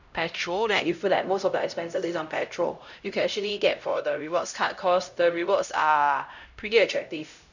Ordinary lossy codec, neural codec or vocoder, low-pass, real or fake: none; codec, 16 kHz, 0.5 kbps, X-Codec, HuBERT features, trained on LibriSpeech; 7.2 kHz; fake